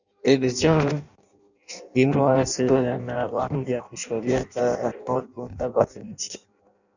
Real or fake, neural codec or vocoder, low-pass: fake; codec, 16 kHz in and 24 kHz out, 0.6 kbps, FireRedTTS-2 codec; 7.2 kHz